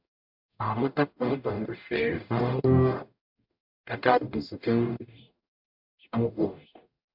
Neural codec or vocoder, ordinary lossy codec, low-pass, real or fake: codec, 44.1 kHz, 0.9 kbps, DAC; AAC, 48 kbps; 5.4 kHz; fake